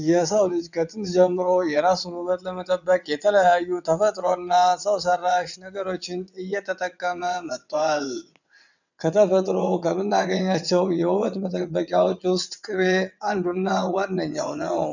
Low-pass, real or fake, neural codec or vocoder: 7.2 kHz; fake; vocoder, 22.05 kHz, 80 mel bands, WaveNeXt